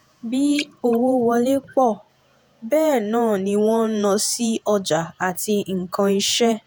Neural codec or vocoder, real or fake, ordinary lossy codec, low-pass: vocoder, 48 kHz, 128 mel bands, Vocos; fake; none; none